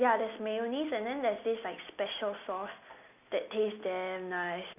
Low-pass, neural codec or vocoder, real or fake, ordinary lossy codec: 3.6 kHz; none; real; none